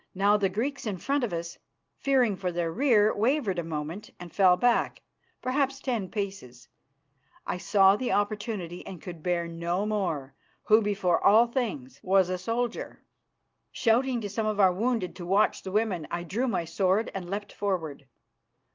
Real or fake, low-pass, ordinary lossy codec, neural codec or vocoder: real; 7.2 kHz; Opus, 32 kbps; none